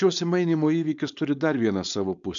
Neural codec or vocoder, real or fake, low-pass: codec, 16 kHz, 4.8 kbps, FACodec; fake; 7.2 kHz